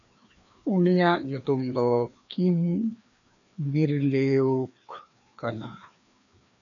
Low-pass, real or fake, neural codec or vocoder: 7.2 kHz; fake; codec, 16 kHz, 2 kbps, FreqCodec, larger model